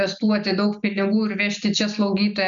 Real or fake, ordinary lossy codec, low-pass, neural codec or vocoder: real; MP3, 96 kbps; 7.2 kHz; none